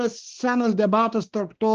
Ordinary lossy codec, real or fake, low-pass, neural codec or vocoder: Opus, 16 kbps; fake; 7.2 kHz; codec, 16 kHz, 2 kbps, X-Codec, WavLM features, trained on Multilingual LibriSpeech